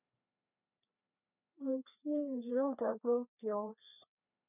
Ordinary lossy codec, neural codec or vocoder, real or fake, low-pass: none; codec, 16 kHz, 2 kbps, FreqCodec, larger model; fake; 3.6 kHz